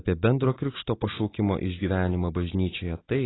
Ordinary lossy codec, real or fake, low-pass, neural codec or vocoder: AAC, 16 kbps; real; 7.2 kHz; none